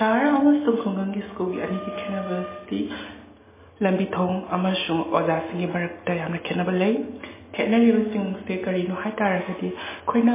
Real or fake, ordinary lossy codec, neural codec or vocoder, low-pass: real; MP3, 16 kbps; none; 3.6 kHz